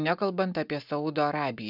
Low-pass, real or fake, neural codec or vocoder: 5.4 kHz; real; none